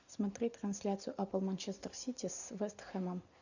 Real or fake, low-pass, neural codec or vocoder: real; 7.2 kHz; none